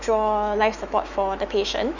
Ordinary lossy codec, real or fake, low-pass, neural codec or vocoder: none; fake; 7.2 kHz; autoencoder, 48 kHz, 128 numbers a frame, DAC-VAE, trained on Japanese speech